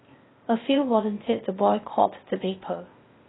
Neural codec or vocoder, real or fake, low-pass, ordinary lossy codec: codec, 16 kHz, 0.8 kbps, ZipCodec; fake; 7.2 kHz; AAC, 16 kbps